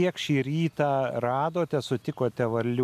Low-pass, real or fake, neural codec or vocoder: 14.4 kHz; real; none